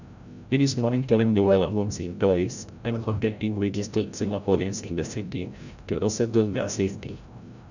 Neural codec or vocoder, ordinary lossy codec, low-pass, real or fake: codec, 16 kHz, 0.5 kbps, FreqCodec, larger model; none; 7.2 kHz; fake